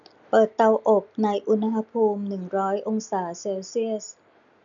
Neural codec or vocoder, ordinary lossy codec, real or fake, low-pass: none; none; real; 7.2 kHz